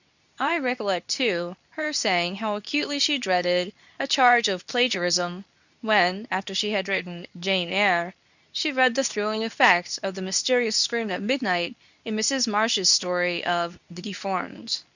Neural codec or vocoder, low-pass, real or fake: codec, 24 kHz, 0.9 kbps, WavTokenizer, medium speech release version 2; 7.2 kHz; fake